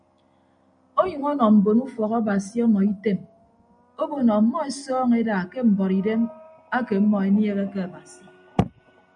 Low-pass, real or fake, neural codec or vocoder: 9.9 kHz; real; none